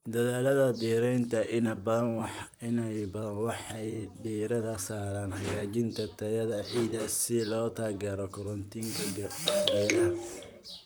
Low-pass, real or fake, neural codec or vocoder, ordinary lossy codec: none; fake; vocoder, 44.1 kHz, 128 mel bands, Pupu-Vocoder; none